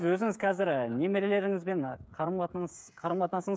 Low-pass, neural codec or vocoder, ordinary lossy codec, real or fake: none; codec, 16 kHz, 8 kbps, FreqCodec, smaller model; none; fake